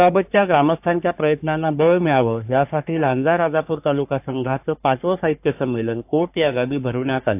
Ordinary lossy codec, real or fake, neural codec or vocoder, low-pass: AAC, 32 kbps; fake; codec, 44.1 kHz, 3.4 kbps, Pupu-Codec; 3.6 kHz